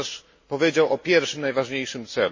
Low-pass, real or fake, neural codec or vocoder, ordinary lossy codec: 7.2 kHz; real; none; MP3, 32 kbps